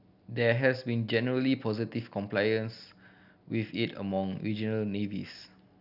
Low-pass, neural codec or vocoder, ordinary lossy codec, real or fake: 5.4 kHz; none; none; real